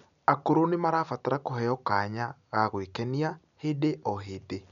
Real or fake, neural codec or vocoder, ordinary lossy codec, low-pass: real; none; none; 7.2 kHz